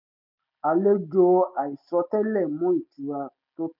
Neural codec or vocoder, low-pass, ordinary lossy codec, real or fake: none; 5.4 kHz; none; real